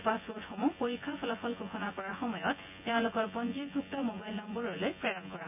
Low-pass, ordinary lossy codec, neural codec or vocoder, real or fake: 3.6 kHz; none; vocoder, 24 kHz, 100 mel bands, Vocos; fake